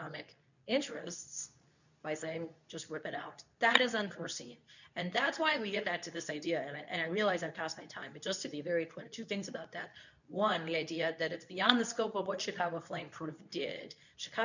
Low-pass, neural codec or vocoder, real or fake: 7.2 kHz; codec, 24 kHz, 0.9 kbps, WavTokenizer, medium speech release version 2; fake